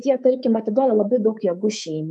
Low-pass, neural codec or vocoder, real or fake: 10.8 kHz; codec, 24 kHz, 3.1 kbps, DualCodec; fake